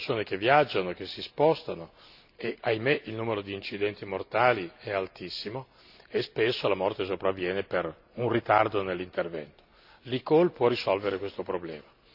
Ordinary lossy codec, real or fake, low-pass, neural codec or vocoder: none; real; 5.4 kHz; none